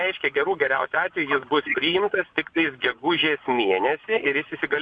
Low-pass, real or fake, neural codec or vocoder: 9.9 kHz; fake; vocoder, 24 kHz, 100 mel bands, Vocos